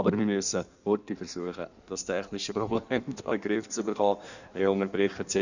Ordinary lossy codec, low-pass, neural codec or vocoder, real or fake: none; 7.2 kHz; codec, 16 kHz in and 24 kHz out, 1.1 kbps, FireRedTTS-2 codec; fake